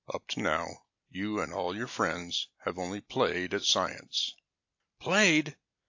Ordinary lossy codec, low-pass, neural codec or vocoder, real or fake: AAC, 48 kbps; 7.2 kHz; none; real